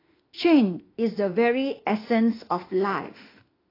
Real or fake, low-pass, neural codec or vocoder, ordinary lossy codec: fake; 5.4 kHz; autoencoder, 48 kHz, 32 numbers a frame, DAC-VAE, trained on Japanese speech; AAC, 24 kbps